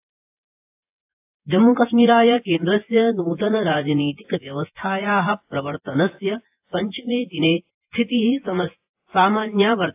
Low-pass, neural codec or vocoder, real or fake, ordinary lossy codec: 3.6 kHz; vocoder, 24 kHz, 100 mel bands, Vocos; fake; AAC, 32 kbps